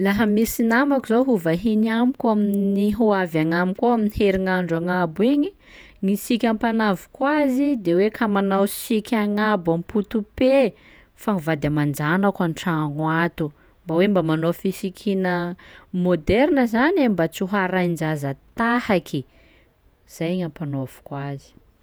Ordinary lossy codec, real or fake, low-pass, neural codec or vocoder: none; fake; none; vocoder, 48 kHz, 128 mel bands, Vocos